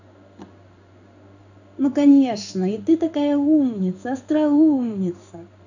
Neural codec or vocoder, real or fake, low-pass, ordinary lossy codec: codec, 16 kHz in and 24 kHz out, 1 kbps, XY-Tokenizer; fake; 7.2 kHz; none